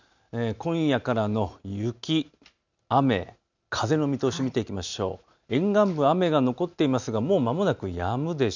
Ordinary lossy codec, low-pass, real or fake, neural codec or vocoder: none; 7.2 kHz; real; none